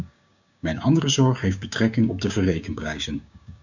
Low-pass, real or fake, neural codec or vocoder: 7.2 kHz; fake; codec, 16 kHz, 6 kbps, DAC